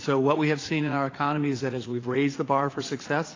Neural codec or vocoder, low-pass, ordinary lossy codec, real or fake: vocoder, 44.1 kHz, 80 mel bands, Vocos; 7.2 kHz; AAC, 32 kbps; fake